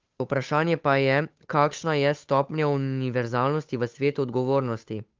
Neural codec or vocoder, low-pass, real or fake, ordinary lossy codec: codec, 16 kHz, 8 kbps, FunCodec, trained on Chinese and English, 25 frames a second; 7.2 kHz; fake; Opus, 32 kbps